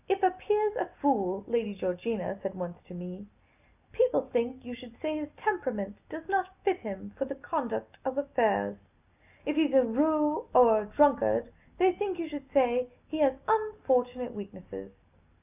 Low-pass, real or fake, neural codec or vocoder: 3.6 kHz; real; none